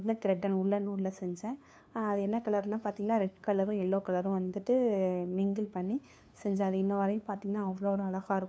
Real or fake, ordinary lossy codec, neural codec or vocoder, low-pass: fake; none; codec, 16 kHz, 2 kbps, FunCodec, trained on LibriTTS, 25 frames a second; none